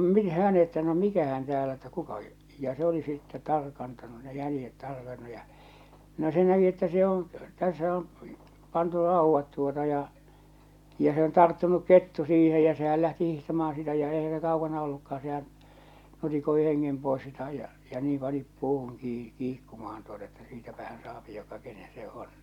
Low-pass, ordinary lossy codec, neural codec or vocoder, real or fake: 19.8 kHz; MP3, 96 kbps; none; real